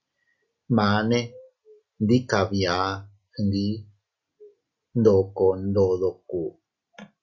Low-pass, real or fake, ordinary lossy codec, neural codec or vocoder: 7.2 kHz; real; Opus, 64 kbps; none